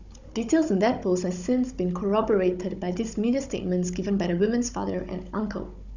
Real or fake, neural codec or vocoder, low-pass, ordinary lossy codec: fake; codec, 16 kHz, 16 kbps, FunCodec, trained on Chinese and English, 50 frames a second; 7.2 kHz; none